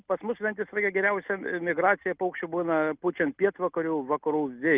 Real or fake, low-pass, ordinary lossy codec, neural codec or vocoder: real; 3.6 kHz; Opus, 24 kbps; none